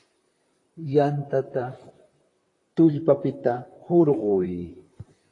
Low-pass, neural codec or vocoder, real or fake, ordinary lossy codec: 10.8 kHz; vocoder, 44.1 kHz, 128 mel bands, Pupu-Vocoder; fake; AAC, 32 kbps